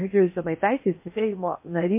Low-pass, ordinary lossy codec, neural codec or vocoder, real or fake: 3.6 kHz; MP3, 24 kbps; codec, 16 kHz in and 24 kHz out, 0.8 kbps, FocalCodec, streaming, 65536 codes; fake